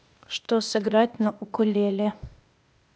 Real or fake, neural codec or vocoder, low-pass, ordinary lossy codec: fake; codec, 16 kHz, 0.8 kbps, ZipCodec; none; none